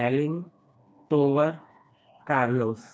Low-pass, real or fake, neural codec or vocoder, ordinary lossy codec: none; fake; codec, 16 kHz, 2 kbps, FreqCodec, smaller model; none